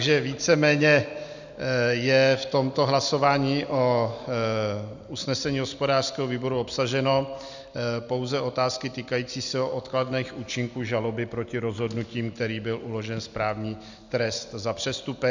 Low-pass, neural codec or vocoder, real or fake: 7.2 kHz; none; real